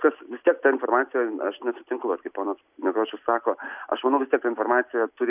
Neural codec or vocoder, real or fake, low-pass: none; real; 3.6 kHz